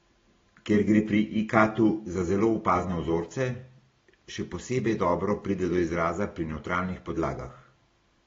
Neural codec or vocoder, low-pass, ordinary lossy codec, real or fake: none; 7.2 kHz; AAC, 24 kbps; real